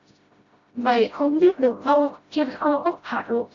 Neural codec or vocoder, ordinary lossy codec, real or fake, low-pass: codec, 16 kHz, 0.5 kbps, FreqCodec, smaller model; Opus, 64 kbps; fake; 7.2 kHz